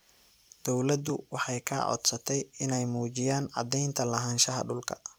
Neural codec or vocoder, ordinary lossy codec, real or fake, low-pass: vocoder, 44.1 kHz, 128 mel bands every 256 samples, BigVGAN v2; none; fake; none